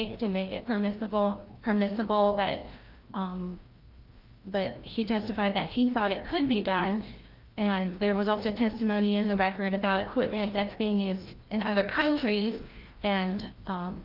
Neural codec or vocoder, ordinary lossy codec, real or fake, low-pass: codec, 16 kHz, 1 kbps, FreqCodec, larger model; Opus, 32 kbps; fake; 5.4 kHz